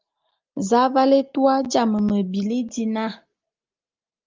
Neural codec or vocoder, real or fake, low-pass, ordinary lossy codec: none; real; 7.2 kHz; Opus, 24 kbps